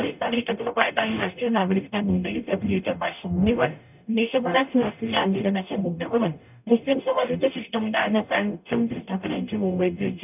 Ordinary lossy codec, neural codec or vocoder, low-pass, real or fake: none; codec, 44.1 kHz, 0.9 kbps, DAC; 3.6 kHz; fake